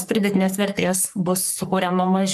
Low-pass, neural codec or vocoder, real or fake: 14.4 kHz; codec, 44.1 kHz, 3.4 kbps, Pupu-Codec; fake